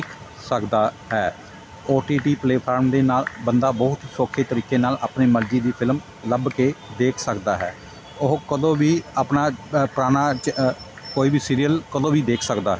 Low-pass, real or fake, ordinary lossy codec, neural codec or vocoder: none; real; none; none